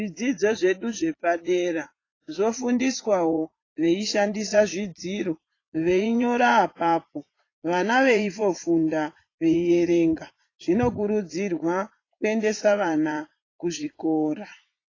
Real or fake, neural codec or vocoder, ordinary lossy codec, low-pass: fake; vocoder, 44.1 kHz, 80 mel bands, Vocos; AAC, 32 kbps; 7.2 kHz